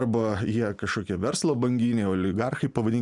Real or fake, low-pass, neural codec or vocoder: real; 10.8 kHz; none